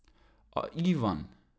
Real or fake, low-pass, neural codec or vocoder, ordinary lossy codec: real; none; none; none